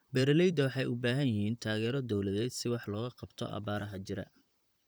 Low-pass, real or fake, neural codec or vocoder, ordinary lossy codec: none; fake; vocoder, 44.1 kHz, 128 mel bands, Pupu-Vocoder; none